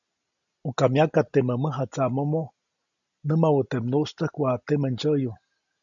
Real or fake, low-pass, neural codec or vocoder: real; 7.2 kHz; none